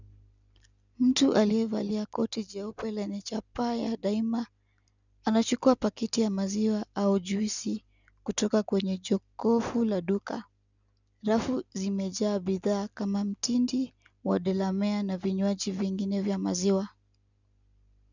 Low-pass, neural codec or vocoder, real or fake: 7.2 kHz; none; real